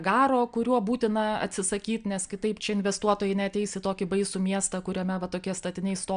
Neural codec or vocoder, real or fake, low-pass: none; real; 9.9 kHz